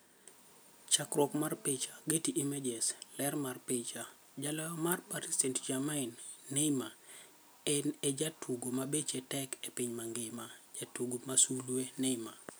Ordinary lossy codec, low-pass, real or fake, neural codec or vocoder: none; none; real; none